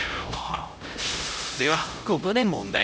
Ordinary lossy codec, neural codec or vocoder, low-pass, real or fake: none; codec, 16 kHz, 0.5 kbps, X-Codec, HuBERT features, trained on LibriSpeech; none; fake